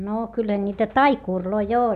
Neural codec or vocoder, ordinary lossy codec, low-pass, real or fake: none; none; 14.4 kHz; real